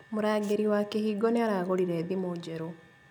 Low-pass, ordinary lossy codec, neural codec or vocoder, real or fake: none; none; none; real